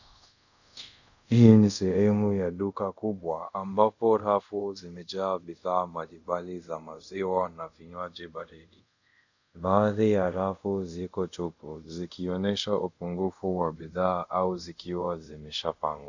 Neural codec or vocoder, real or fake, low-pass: codec, 24 kHz, 0.5 kbps, DualCodec; fake; 7.2 kHz